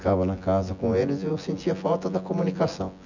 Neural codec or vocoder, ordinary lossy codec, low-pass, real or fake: vocoder, 24 kHz, 100 mel bands, Vocos; none; 7.2 kHz; fake